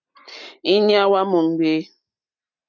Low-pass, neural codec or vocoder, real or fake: 7.2 kHz; none; real